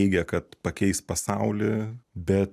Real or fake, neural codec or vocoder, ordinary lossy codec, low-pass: real; none; MP3, 96 kbps; 14.4 kHz